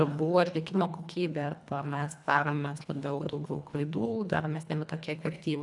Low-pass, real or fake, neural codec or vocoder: 10.8 kHz; fake; codec, 24 kHz, 1.5 kbps, HILCodec